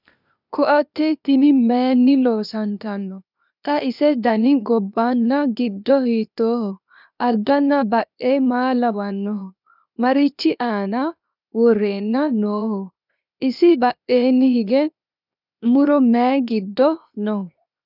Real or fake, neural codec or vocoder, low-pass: fake; codec, 16 kHz, 0.8 kbps, ZipCodec; 5.4 kHz